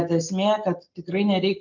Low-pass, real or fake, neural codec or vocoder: 7.2 kHz; real; none